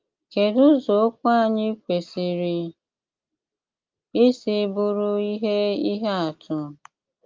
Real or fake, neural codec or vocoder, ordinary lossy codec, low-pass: real; none; Opus, 24 kbps; 7.2 kHz